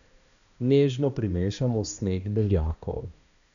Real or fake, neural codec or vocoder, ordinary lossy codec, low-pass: fake; codec, 16 kHz, 1 kbps, X-Codec, HuBERT features, trained on balanced general audio; none; 7.2 kHz